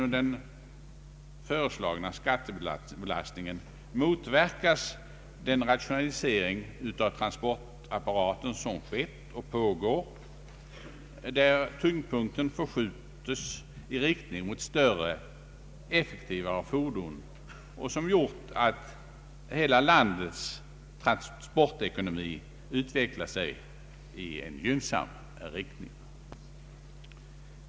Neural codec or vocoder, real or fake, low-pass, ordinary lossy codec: none; real; none; none